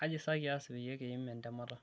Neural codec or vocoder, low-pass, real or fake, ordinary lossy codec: none; none; real; none